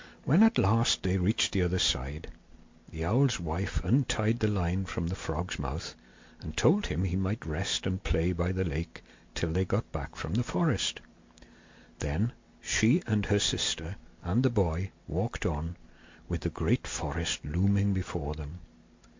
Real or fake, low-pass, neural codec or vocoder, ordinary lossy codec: real; 7.2 kHz; none; MP3, 48 kbps